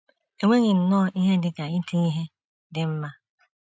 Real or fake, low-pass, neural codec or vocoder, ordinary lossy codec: real; none; none; none